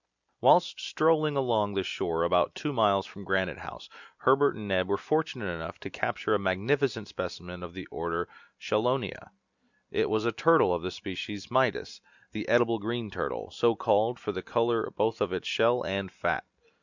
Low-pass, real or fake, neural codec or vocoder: 7.2 kHz; real; none